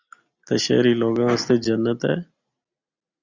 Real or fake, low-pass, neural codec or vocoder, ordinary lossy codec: real; 7.2 kHz; none; Opus, 64 kbps